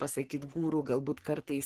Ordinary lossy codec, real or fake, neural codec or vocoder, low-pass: Opus, 16 kbps; fake; codec, 44.1 kHz, 3.4 kbps, Pupu-Codec; 14.4 kHz